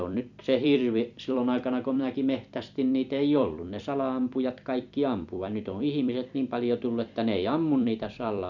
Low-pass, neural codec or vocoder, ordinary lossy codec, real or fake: 7.2 kHz; vocoder, 44.1 kHz, 128 mel bands every 256 samples, BigVGAN v2; none; fake